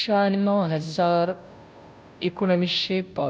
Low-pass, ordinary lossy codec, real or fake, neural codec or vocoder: none; none; fake; codec, 16 kHz, 0.5 kbps, FunCodec, trained on Chinese and English, 25 frames a second